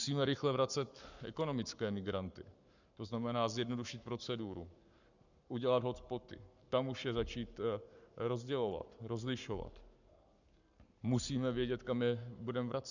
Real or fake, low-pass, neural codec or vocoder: fake; 7.2 kHz; codec, 44.1 kHz, 7.8 kbps, Pupu-Codec